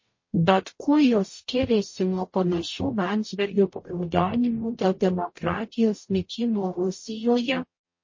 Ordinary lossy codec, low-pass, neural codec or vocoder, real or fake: MP3, 32 kbps; 7.2 kHz; codec, 44.1 kHz, 0.9 kbps, DAC; fake